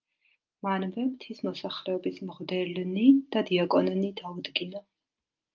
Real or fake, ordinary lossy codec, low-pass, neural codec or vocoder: real; Opus, 24 kbps; 7.2 kHz; none